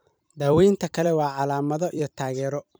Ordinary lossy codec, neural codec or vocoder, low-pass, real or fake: none; vocoder, 44.1 kHz, 128 mel bands every 256 samples, BigVGAN v2; none; fake